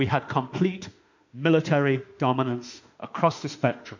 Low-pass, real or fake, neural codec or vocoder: 7.2 kHz; fake; autoencoder, 48 kHz, 32 numbers a frame, DAC-VAE, trained on Japanese speech